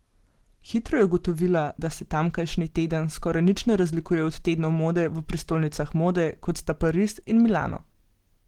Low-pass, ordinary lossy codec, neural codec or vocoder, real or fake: 19.8 kHz; Opus, 16 kbps; none; real